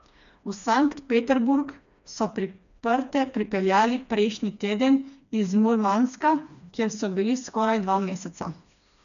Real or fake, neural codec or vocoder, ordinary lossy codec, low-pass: fake; codec, 16 kHz, 2 kbps, FreqCodec, smaller model; none; 7.2 kHz